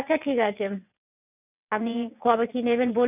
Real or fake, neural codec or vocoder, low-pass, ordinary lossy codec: fake; vocoder, 22.05 kHz, 80 mel bands, WaveNeXt; 3.6 kHz; none